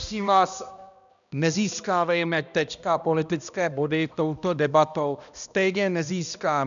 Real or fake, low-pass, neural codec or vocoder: fake; 7.2 kHz; codec, 16 kHz, 1 kbps, X-Codec, HuBERT features, trained on balanced general audio